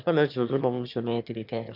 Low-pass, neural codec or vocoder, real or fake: 5.4 kHz; autoencoder, 22.05 kHz, a latent of 192 numbers a frame, VITS, trained on one speaker; fake